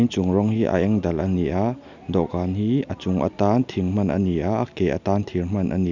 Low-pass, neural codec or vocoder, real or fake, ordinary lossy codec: 7.2 kHz; none; real; none